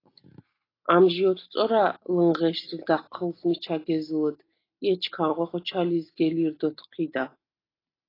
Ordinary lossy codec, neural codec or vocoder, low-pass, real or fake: AAC, 24 kbps; none; 5.4 kHz; real